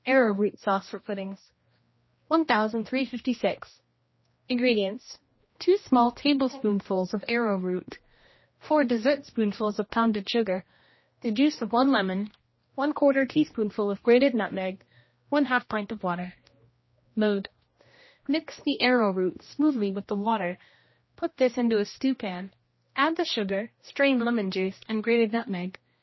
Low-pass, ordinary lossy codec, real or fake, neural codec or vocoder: 7.2 kHz; MP3, 24 kbps; fake; codec, 16 kHz, 1 kbps, X-Codec, HuBERT features, trained on general audio